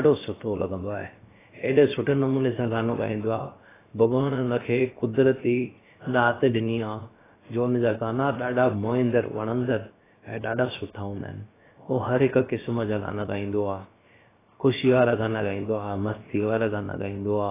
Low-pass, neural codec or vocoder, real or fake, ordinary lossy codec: 3.6 kHz; codec, 16 kHz, about 1 kbps, DyCAST, with the encoder's durations; fake; AAC, 16 kbps